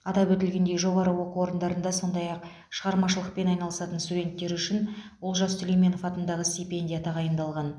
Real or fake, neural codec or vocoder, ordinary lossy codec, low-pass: real; none; none; none